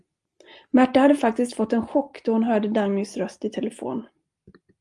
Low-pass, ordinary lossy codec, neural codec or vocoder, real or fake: 10.8 kHz; Opus, 32 kbps; none; real